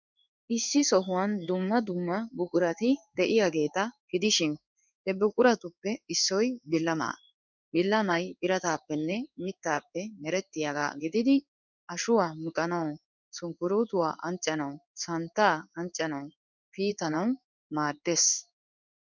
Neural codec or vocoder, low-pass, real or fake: codec, 16 kHz in and 24 kHz out, 1 kbps, XY-Tokenizer; 7.2 kHz; fake